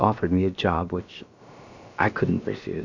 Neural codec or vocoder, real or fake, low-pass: codec, 16 kHz, about 1 kbps, DyCAST, with the encoder's durations; fake; 7.2 kHz